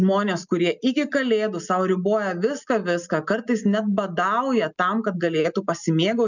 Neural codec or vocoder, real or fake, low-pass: none; real; 7.2 kHz